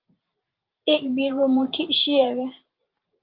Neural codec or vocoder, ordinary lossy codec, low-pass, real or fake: vocoder, 44.1 kHz, 128 mel bands every 512 samples, BigVGAN v2; Opus, 32 kbps; 5.4 kHz; fake